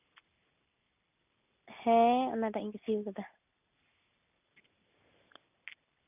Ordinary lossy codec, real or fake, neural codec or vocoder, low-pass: none; real; none; 3.6 kHz